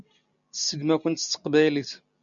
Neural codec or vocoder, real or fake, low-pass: none; real; 7.2 kHz